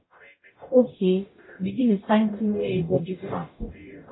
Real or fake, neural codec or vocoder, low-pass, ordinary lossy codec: fake; codec, 44.1 kHz, 0.9 kbps, DAC; 7.2 kHz; AAC, 16 kbps